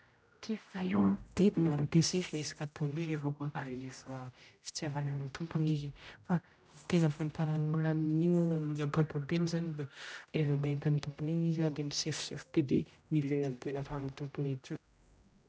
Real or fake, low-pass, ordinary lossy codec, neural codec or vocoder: fake; none; none; codec, 16 kHz, 0.5 kbps, X-Codec, HuBERT features, trained on general audio